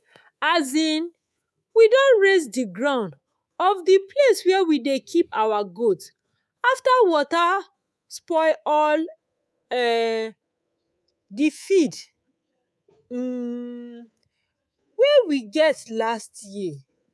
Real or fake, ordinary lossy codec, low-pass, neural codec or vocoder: fake; none; none; codec, 24 kHz, 3.1 kbps, DualCodec